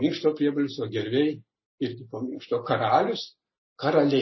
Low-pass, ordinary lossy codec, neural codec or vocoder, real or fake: 7.2 kHz; MP3, 24 kbps; none; real